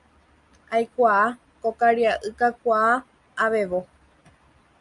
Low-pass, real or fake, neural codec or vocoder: 10.8 kHz; real; none